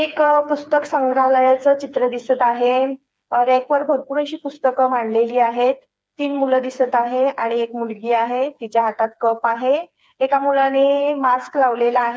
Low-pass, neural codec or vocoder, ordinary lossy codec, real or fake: none; codec, 16 kHz, 4 kbps, FreqCodec, smaller model; none; fake